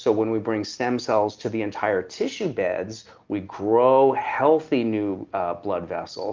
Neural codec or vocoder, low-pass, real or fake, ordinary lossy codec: none; 7.2 kHz; real; Opus, 32 kbps